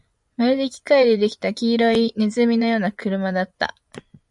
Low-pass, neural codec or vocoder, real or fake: 10.8 kHz; vocoder, 24 kHz, 100 mel bands, Vocos; fake